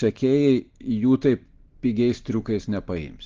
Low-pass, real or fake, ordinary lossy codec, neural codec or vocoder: 7.2 kHz; real; Opus, 16 kbps; none